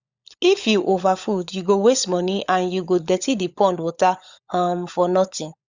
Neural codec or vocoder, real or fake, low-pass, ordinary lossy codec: codec, 16 kHz, 16 kbps, FunCodec, trained on LibriTTS, 50 frames a second; fake; 7.2 kHz; Opus, 64 kbps